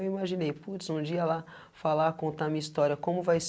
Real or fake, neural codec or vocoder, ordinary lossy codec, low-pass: real; none; none; none